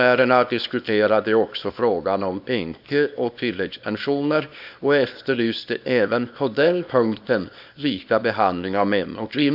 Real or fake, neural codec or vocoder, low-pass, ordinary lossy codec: fake; codec, 24 kHz, 0.9 kbps, WavTokenizer, small release; 5.4 kHz; none